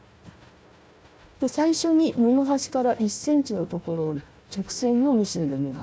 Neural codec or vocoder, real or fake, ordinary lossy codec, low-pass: codec, 16 kHz, 1 kbps, FunCodec, trained on Chinese and English, 50 frames a second; fake; none; none